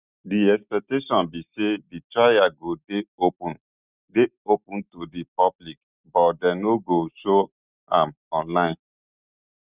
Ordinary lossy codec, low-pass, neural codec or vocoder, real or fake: Opus, 64 kbps; 3.6 kHz; none; real